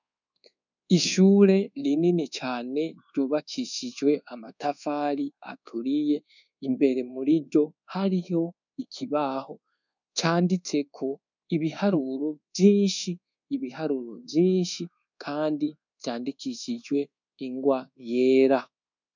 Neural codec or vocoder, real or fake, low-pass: codec, 24 kHz, 1.2 kbps, DualCodec; fake; 7.2 kHz